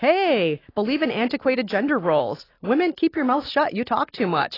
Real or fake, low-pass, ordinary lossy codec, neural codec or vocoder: real; 5.4 kHz; AAC, 24 kbps; none